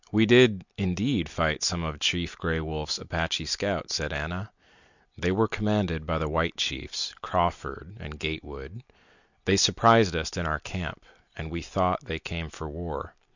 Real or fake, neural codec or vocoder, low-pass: real; none; 7.2 kHz